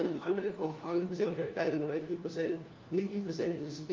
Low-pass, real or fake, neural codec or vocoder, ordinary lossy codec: 7.2 kHz; fake; codec, 16 kHz, 1 kbps, FunCodec, trained on LibriTTS, 50 frames a second; Opus, 24 kbps